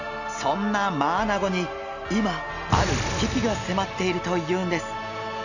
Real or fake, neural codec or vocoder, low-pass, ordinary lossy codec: real; none; 7.2 kHz; none